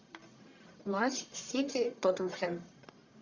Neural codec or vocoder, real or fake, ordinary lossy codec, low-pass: codec, 44.1 kHz, 1.7 kbps, Pupu-Codec; fake; Opus, 64 kbps; 7.2 kHz